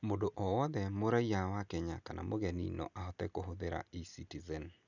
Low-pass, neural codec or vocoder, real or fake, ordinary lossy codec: 7.2 kHz; none; real; none